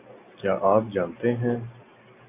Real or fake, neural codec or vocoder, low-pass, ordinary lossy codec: real; none; 3.6 kHz; AAC, 24 kbps